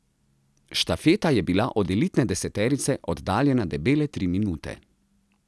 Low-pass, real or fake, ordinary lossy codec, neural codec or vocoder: none; real; none; none